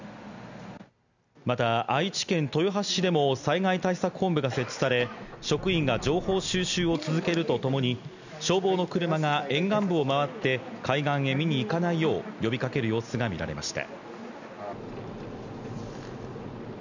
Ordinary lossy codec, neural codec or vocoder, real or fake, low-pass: none; none; real; 7.2 kHz